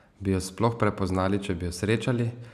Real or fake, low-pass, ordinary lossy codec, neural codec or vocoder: real; 14.4 kHz; none; none